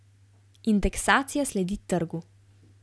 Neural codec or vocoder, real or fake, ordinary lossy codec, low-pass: none; real; none; none